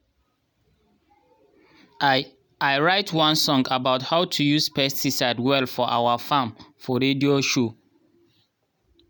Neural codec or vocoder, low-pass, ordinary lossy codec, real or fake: none; none; none; real